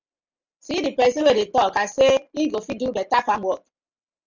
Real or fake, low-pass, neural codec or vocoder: real; 7.2 kHz; none